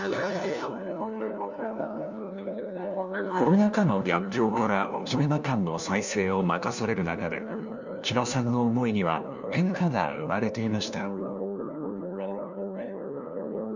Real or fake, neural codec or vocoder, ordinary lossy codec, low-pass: fake; codec, 16 kHz, 1 kbps, FunCodec, trained on LibriTTS, 50 frames a second; none; 7.2 kHz